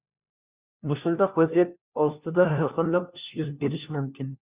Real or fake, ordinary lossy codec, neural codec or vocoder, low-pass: fake; Opus, 64 kbps; codec, 16 kHz, 1 kbps, FunCodec, trained on LibriTTS, 50 frames a second; 3.6 kHz